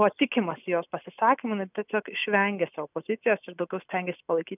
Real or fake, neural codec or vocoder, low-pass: real; none; 3.6 kHz